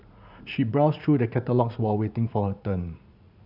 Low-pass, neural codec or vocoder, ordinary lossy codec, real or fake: 5.4 kHz; vocoder, 44.1 kHz, 80 mel bands, Vocos; none; fake